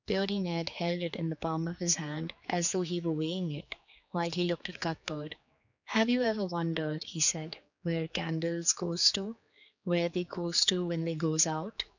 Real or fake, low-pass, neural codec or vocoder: fake; 7.2 kHz; codec, 16 kHz, 4 kbps, X-Codec, HuBERT features, trained on general audio